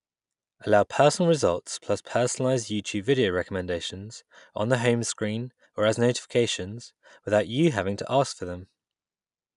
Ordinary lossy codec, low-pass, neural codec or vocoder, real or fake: none; 10.8 kHz; none; real